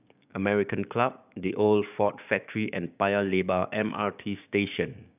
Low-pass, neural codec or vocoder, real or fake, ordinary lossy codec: 3.6 kHz; codec, 16 kHz, 6 kbps, DAC; fake; none